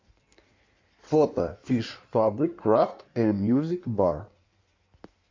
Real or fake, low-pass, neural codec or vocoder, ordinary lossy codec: fake; 7.2 kHz; codec, 16 kHz in and 24 kHz out, 1.1 kbps, FireRedTTS-2 codec; MP3, 48 kbps